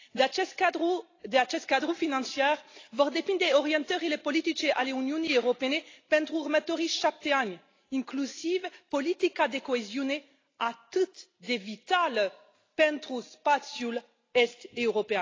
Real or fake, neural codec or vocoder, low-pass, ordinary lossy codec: real; none; 7.2 kHz; AAC, 32 kbps